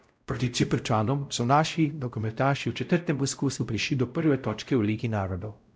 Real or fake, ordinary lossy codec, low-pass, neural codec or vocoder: fake; none; none; codec, 16 kHz, 0.5 kbps, X-Codec, WavLM features, trained on Multilingual LibriSpeech